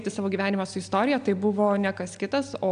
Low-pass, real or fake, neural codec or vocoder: 9.9 kHz; real; none